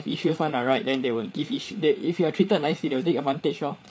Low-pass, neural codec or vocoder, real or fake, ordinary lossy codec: none; codec, 16 kHz, 8 kbps, FreqCodec, larger model; fake; none